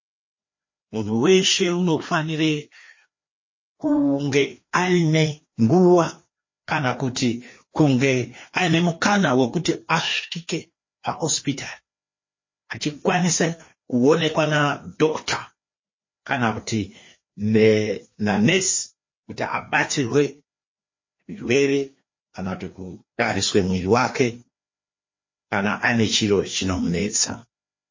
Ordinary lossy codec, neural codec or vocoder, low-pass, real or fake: MP3, 32 kbps; codec, 16 kHz, 2 kbps, FreqCodec, larger model; 7.2 kHz; fake